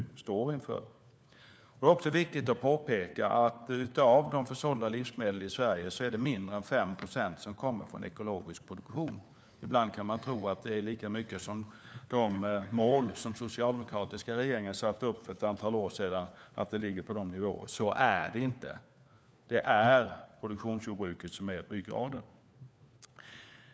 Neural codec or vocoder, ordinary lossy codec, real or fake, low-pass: codec, 16 kHz, 4 kbps, FunCodec, trained on LibriTTS, 50 frames a second; none; fake; none